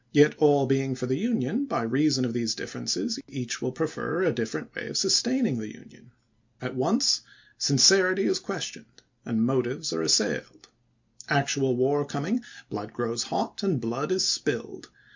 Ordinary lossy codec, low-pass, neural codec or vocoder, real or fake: MP3, 48 kbps; 7.2 kHz; none; real